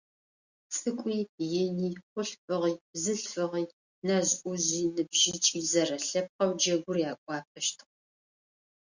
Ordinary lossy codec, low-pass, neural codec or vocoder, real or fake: Opus, 64 kbps; 7.2 kHz; none; real